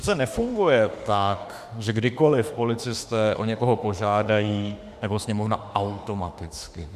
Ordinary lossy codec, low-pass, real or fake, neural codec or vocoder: Opus, 64 kbps; 14.4 kHz; fake; autoencoder, 48 kHz, 32 numbers a frame, DAC-VAE, trained on Japanese speech